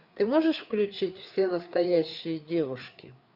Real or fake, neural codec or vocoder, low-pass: fake; codec, 16 kHz, 4 kbps, FreqCodec, larger model; 5.4 kHz